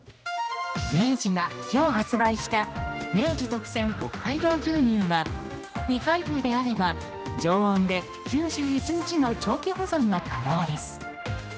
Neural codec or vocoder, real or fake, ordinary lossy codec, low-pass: codec, 16 kHz, 1 kbps, X-Codec, HuBERT features, trained on general audio; fake; none; none